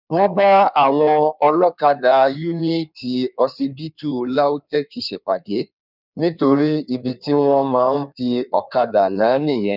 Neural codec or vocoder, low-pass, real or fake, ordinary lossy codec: codec, 16 kHz in and 24 kHz out, 1.1 kbps, FireRedTTS-2 codec; 5.4 kHz; fake; none